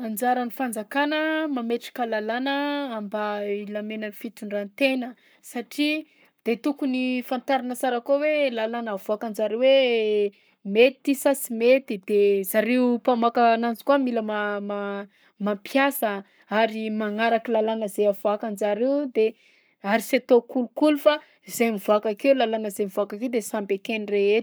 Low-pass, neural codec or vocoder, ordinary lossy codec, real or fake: none; codec, 44.1 kHz, 7.8 kbps, Pupu-Codec; none; fake